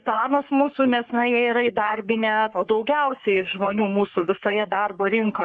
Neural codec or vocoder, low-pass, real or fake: codec, 44.1 kHz, 3.4 kbps, Pupu-Codec; 9.9 kHz; fake